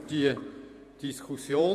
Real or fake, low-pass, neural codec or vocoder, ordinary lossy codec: fake; 14.4 kHz; vocoder, 48 kHz, 128 mel bands, Vocos; AAC, 96 kbps